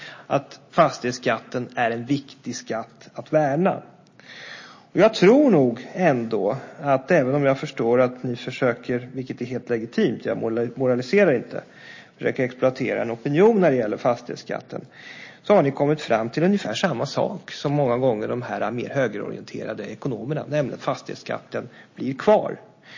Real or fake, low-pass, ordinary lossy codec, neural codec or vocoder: real; 7.2 kHz; MP3, 32 kbps; none